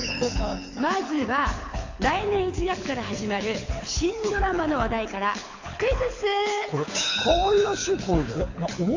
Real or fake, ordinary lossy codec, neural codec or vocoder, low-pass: fake; AAC, 48 kbps; codec, 24 kHz, 6 kbps, HILCodec; 7.2 kHz